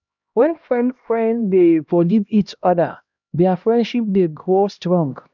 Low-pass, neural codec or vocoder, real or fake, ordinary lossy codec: 7.2 kHz; codec, 16 kHz, 1 kbps, X-Codec, HuBERT features, trained on LibriSpeech; fake; none